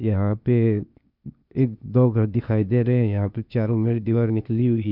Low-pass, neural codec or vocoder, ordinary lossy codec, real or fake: 5.4 kHz; codec, 16 kHz, 0.8 kbps, ZipCodec; none; fake